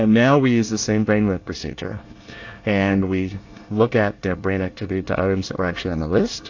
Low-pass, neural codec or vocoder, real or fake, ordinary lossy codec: 7.2 kHz; codec, 24 kHz, 1 kbps, SNAC; fake; AAC, 48 kbps